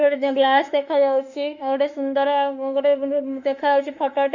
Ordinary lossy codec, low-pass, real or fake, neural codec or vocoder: none; 7.2 kHz; fake; autoencoder, 48 kHz, 32 numbers a frame, DAC-VAE, trained on Japanese speech